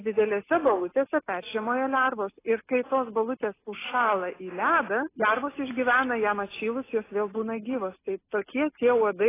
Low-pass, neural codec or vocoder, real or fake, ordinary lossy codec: 3.6 kHz; none; real; AAC, 16 kbps